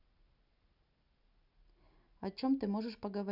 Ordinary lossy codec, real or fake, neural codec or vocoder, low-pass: none; real; none; 5.4 kHz